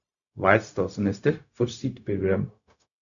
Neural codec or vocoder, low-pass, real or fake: codec, 16 kHz, 0.4 kbps, LongCat-Audio-Codec; 7.2 kHz; fake